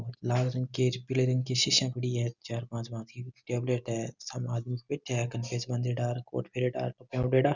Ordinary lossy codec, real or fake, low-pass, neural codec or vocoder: none; real; 7.2 kHz; none